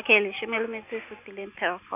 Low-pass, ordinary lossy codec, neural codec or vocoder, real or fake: 3.6 kHz; none; none; real